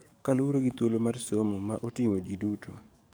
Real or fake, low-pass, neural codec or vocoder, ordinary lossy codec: fake; none; codec, 44.1 kHz, 7.8 kbps, DAC; none